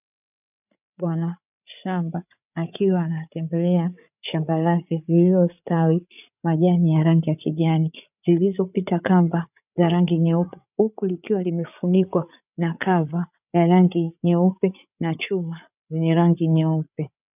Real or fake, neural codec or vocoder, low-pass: fake; codec, 16 kHz, 8 kbps, FreqCodec, larger model; 3.6 kHz